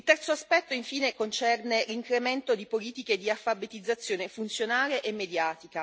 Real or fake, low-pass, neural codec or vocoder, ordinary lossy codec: real; none; none; none